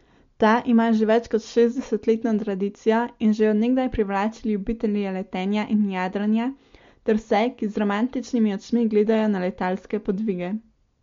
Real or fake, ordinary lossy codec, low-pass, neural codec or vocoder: real; MP3, 48 kbps; 7.2 kHz; none